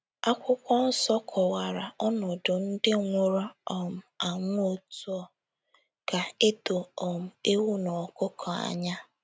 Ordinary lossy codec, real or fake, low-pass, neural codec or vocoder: none; real; none; none